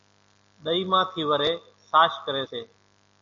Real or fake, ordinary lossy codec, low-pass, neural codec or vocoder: real; MP3, 64 kbps; 7.2 kHz; none